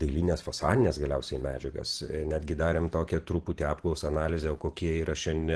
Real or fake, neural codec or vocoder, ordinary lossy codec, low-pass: fake; vocoder, 44.1 kHz, 128 mel bands every 512 samples, BigVGAN v2; Opus, 16 kbps; 10.8 kHz